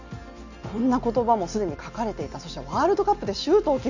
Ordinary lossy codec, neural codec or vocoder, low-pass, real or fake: none; none; 7.2 kHz; real